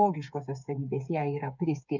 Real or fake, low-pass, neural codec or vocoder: fake; 7.2 kHz; codec, 16 kHz, 8 kbps, FreqCodec, smaller model